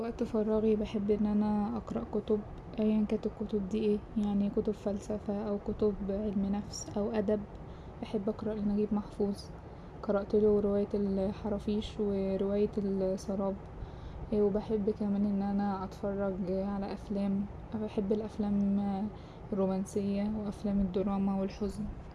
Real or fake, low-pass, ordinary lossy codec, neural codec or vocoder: real; none; none; none